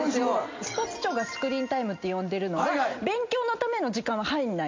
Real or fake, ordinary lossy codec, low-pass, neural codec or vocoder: real; MP3, 48 kbps; 7.2 kHz; none